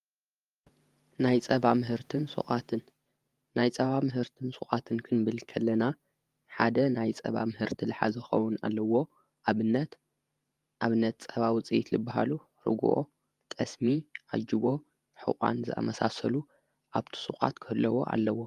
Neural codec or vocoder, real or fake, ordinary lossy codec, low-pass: vocoder, 48 kHz, 128 mel bands, Vocos; fake; Opus, 32 kbps; 14.4 kHz